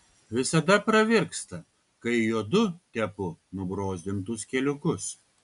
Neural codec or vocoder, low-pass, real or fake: none; 10.8 kHz; real